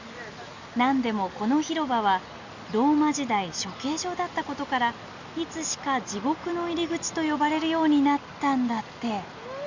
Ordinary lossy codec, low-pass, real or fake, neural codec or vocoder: Opus, 64 kbps; 7.2 kHz; real; none